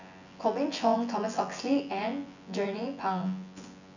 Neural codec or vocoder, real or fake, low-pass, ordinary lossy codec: vocoder, 24 kHz, 100 mel bands, Vocos; fake; 7.2 kHz; none